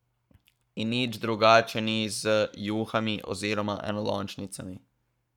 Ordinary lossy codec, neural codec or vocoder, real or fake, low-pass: none; codec, 44.1 kHz, 7.8 kbps, Pupu-Codec; fake; 19.8 kHz